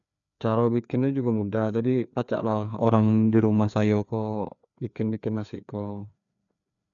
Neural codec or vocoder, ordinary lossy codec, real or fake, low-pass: codec, 16 kHz, 2 kbps, FreqCodec, larger model; none; fake; 7.2 kHz